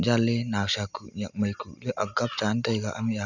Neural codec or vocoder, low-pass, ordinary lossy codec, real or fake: none; 7.2 kHz; none; real